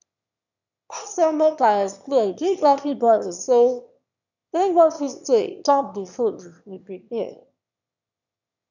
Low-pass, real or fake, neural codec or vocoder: 7.2 kHz; fake; autoencoder, 22.05 kHz, a latent of 192 numbers a frame, VITS, trained on one speaker